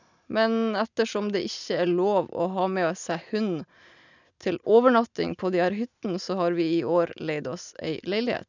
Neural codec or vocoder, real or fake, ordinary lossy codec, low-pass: none; real; none; 7.2 kHz